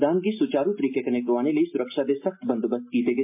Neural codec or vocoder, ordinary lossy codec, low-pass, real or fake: none; MP3, 32 kbps; 3.6 kHz; real